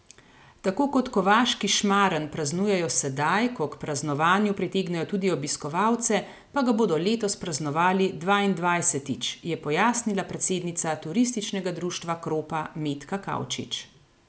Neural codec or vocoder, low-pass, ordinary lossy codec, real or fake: none; none; none; real